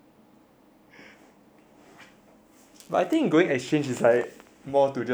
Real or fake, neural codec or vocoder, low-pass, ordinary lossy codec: real; none; none; none